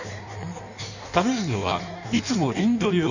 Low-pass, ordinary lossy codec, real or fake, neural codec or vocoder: 7.2 kHz; none; fake; codec, 16 kHz in and 24 kHz out, 1.1 kbps, FireRedTTS-2 codec